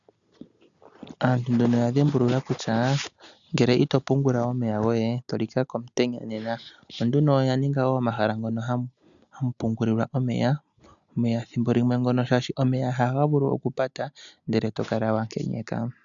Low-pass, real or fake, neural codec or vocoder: 7.2 kHz; real; none